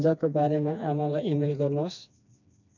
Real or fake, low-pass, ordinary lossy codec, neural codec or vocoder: fake; 7.2 kHz; none; codec, 16 kHz, 2 kbps, FreqCodec, smaller model